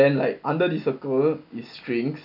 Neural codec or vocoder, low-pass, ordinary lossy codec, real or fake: none; 5.4 kHz; none; real